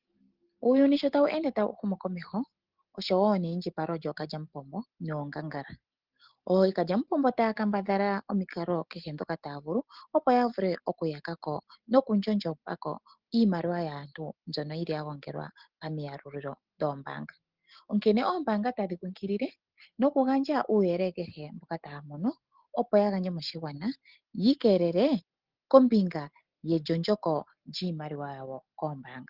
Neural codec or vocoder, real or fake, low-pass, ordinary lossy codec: none; real; 5.4 kHz; Opus, 16 kbps